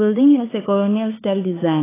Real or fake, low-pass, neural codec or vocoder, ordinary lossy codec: fake; 3.6 kHz; codec, 16 kHz, 4 kbps, X-Codec, HuBERT features, trained on LibriSpeech; AAC, 16 kbps